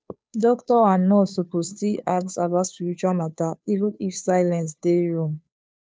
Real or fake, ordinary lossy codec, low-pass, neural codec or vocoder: fake; none; none; codec, 16 kHz, 2 kbps, FunCodec, trained on Chinese and English, 25 frames a second